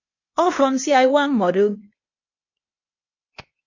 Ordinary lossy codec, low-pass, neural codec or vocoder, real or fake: MP3, 32 kbps; 7.2 kHz; codec, 16 kHz, 0.8 kbps, ZipCodec; fake